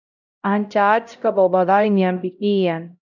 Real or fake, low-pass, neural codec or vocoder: fake; 7.2 kHz; codec, 16 kHz, 0.5 kbps, X-Codec, HuBERT features, trained on LibriSpeech